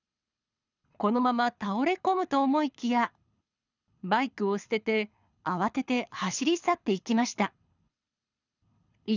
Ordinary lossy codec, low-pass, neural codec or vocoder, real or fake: none; 7.2 kHz; codec, 24 kHz, 6 kbps, HILCodec; fake